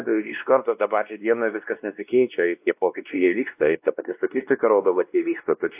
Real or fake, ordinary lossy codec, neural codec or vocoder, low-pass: fake; AAC, 32 kbps; codec, 16 kHz, 1 kbps, X-Codec, WavLM features, trained on Multilingual LibriSpeech; 3.6 kHz